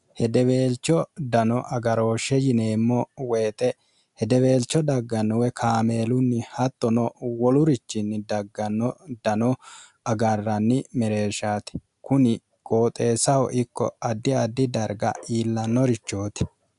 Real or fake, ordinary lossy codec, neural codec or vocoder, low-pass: real; MP3, 96 kbps; none; 10.8 kHz